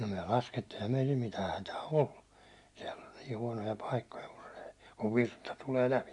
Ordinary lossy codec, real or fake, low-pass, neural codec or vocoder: MP3, 64 kbps; real; 10.8 kHz; none